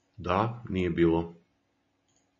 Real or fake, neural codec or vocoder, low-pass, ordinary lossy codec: real; none; 7.2 kHz; AAC, 32 kbps